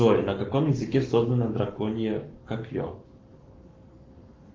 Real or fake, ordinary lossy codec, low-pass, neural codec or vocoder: fake; Opus, 32 kbps; 7.2 kHz; codec, 44.1 kHz, 7.8 kbps, Pupu-Codec